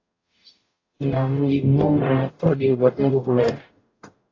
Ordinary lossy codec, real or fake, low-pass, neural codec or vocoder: AAC, 32 kbps; fake; 7.2 kHz; codec, 44.1 kHz, 0.9 kbps, DAC